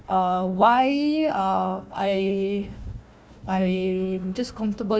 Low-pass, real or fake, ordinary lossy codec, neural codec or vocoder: none; fake; none; codec, 16 kHz, 1 kbps, FunCodec, trained on Chinese and English, 50 frames a second